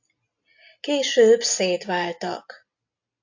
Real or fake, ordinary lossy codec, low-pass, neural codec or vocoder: real; AAC, 48 kbps; 7.2 kHz; none